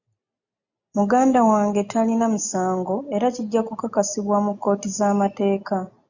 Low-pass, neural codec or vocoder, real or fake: 7.2 kHz; none; real